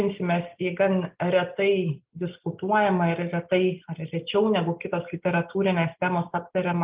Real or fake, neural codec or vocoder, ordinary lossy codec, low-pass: real; none; Opus, 32 kbps; 3.6 kHz